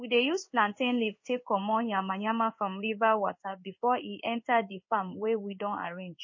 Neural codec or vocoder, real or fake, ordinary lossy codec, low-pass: codec, 16 kHz in and 24 kHz out, 1 kbps, XY-Tokenizer; fake; MP3, 32 kbps; 7.2 kHz